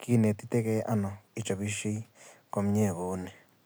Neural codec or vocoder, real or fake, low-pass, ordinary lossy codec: none; real; none; none